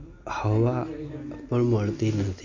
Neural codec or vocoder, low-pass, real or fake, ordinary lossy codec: none; 7.2 kHz; real; AAC, 48 kbps